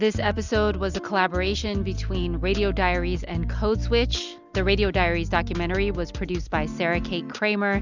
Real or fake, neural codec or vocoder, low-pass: real; none; 7.2 kHz